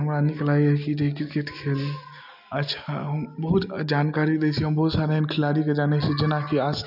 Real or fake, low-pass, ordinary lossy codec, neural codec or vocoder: real; 5.4 kHz; none; none